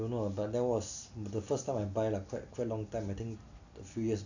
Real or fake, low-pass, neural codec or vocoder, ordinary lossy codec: real; 7.2 kHz; none; none